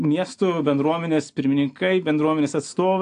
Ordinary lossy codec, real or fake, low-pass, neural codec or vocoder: AAC, 64 kbps; real; 9.9 kHz; none